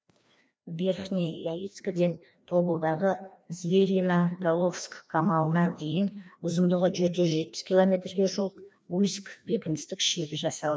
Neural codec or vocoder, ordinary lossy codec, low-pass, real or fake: codec, 16 kHz, 1 kbps, FreqCodec, larger model; none; none; fake